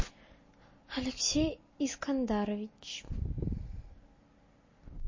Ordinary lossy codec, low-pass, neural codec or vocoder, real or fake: MP3, 32 kbps; 7.2 kHz; none; real